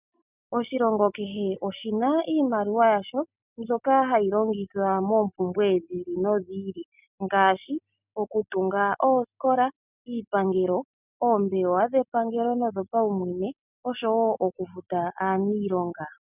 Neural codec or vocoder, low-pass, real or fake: none; 3.6 kHz; real